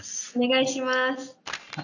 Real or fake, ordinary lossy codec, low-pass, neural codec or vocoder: real; none; 7.2 kHz; none